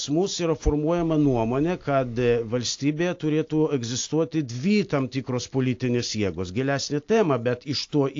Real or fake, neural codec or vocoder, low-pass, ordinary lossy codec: real; none; 7.2 kHz; AAC, 48 kbps